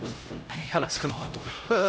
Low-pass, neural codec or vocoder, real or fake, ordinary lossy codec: none; codec, 16 kHz, 0.5 kbps, X-Codec, HuBERT features, trained on LibriSpeech; fake; none